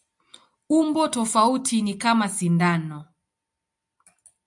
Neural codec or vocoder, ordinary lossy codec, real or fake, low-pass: none; MP3, 96 kbps; real; 10.8 kHz